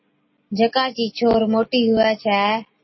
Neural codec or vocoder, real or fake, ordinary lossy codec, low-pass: none; real; MP3, 24 kbps; 7.2 kHz